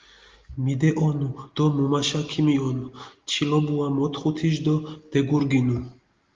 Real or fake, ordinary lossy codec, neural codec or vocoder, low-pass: real; Opus, 32 kbps; none; 7.2 kHz